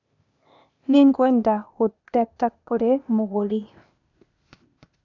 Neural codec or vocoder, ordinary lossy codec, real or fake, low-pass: codec, 16 kHz, 0.8 kbps, ZipCodec; none; fake; 7.2 kHz